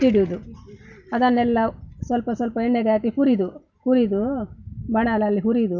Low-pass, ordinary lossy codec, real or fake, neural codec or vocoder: 7.2 kHz; none; real; none